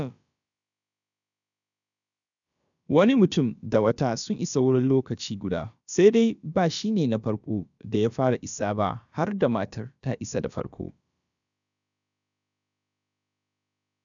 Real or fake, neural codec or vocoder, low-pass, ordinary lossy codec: fake; codec, 16 kHz, about 1 kbps, DyCAST, with the encoder's durations; 7.2 kHz; none